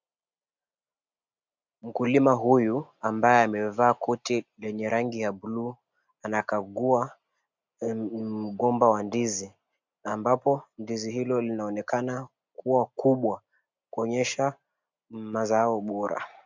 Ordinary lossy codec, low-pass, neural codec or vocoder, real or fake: MP3, 64 kbps; 7.2 kHz; none; real